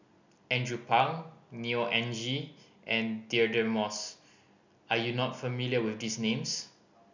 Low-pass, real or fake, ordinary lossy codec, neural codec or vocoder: 7.2 kHz; real; none; none